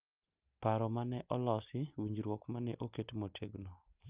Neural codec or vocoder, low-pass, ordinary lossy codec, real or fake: none; 3.6 kHz; Opus, 24 kbps; real